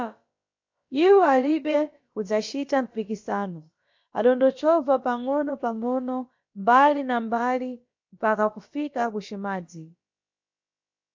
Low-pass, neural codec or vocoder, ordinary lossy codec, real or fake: 7.2 kHz; codec, 16 kHz, about 1 kbps, DyCAST, with the encoder's durations; MP3, 48 kbps; fake